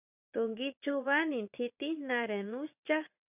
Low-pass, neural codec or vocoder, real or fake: 3.6 kHz; vocoder, 22.05 kHz, 80 mel bands, Vocos; fake